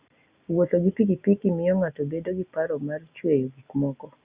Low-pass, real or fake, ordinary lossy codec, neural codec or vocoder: 3.6 kHz; real; Opus, 64 kbps; none